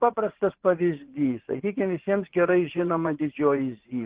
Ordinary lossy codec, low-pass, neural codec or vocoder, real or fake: Opus, 16 kbps; 3.6 kHz; vocoder, 24 kHz, 100 mel bands, Vocos; fake